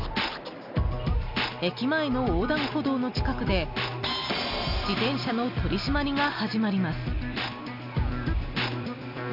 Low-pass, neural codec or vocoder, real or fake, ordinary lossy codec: 5.4 kHz; none; real; none